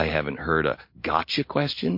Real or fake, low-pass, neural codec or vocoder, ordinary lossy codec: real; 5.4 kHz; none; MP3, 32 kbps